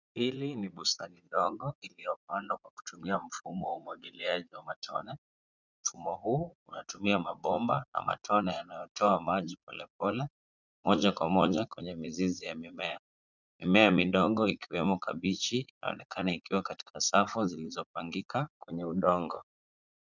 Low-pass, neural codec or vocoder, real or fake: 7.2 kHz; vocoder, 44.1 kHz, 80 mel bands, Vocos; fake